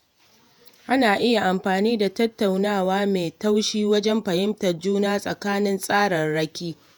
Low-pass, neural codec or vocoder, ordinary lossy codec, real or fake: none; vocoder, 48 kHz, 128 mel bands, Vocos; none; fake